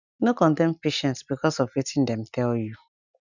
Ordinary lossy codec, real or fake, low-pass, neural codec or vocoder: none; real; 7.2 kHz; none